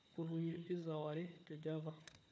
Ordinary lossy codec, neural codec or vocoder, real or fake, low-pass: none; codec, 16 kHz, 4 kbps, FunCodec, trained on Chinese and English, 50 frames a second; fake; none